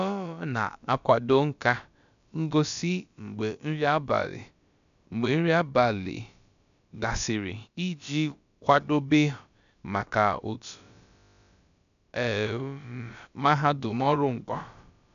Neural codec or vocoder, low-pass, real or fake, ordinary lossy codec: codec, 16 kHz, about 1 kbps, DyCAST, with the encoder's durations; 7.2 kHz; fake; none